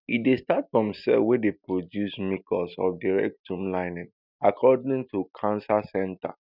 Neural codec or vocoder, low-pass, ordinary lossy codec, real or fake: none; 5.4 kHz; none; real